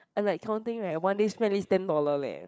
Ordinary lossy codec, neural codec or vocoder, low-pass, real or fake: none; codec, 16 kHz, 8 kbps, FreqCodec, larger model; none; fake